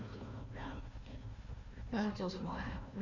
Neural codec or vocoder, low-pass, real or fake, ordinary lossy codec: codec, 16 kHz, 1 kbps, FunCodec, trained on Chinese and English, 50 frames a second; 7.2 kHz; fake; none